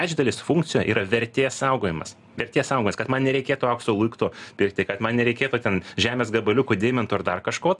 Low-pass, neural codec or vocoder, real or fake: 10.8 kHz; none; real